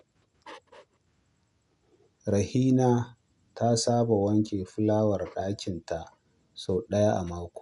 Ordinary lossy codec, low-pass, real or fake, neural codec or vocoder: none; 10.8 kHz; real; none